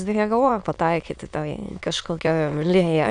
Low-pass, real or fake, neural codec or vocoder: 9.9 kHz; fake; autoencoder, 22.05 kHz, a latent of 192 numbers a frame, VITS, trained on many speakers